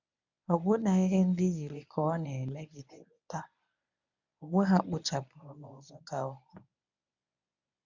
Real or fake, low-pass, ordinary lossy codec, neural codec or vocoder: fake; 7.2 kHz; none; codec, 24 kHz, 0.9 kbps, WavTokenizer, medium speech release version 1